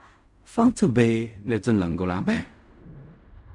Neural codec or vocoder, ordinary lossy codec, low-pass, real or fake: codec, 16 kHz in and 24 kHz out, 0.4 kbps, LongCat-Audio-Codec, fine tuned four codebook decoder; Opus, 64 kbps; 10.8 kHz; fake